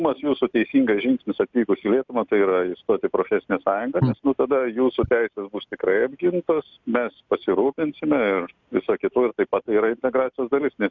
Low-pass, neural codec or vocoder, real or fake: 7.2 kHz; none; real